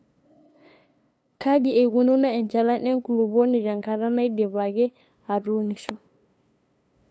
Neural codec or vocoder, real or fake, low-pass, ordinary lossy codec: codec, 16 kHz, 2 kbps, FunCodec, trained on LibriTTS, 25 frames a second; fake; none; none